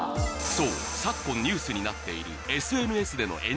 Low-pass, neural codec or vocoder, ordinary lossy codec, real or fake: none; none; none; real